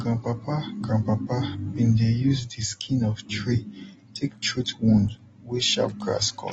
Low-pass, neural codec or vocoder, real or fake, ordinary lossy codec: 19.8 kHz; none; real; AAC, 24 kbps